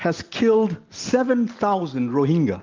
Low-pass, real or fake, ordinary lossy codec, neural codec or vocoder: 7.2 kHz; real; Opus, 32 kbps; none